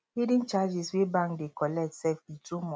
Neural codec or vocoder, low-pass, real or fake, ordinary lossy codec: none; none; real; none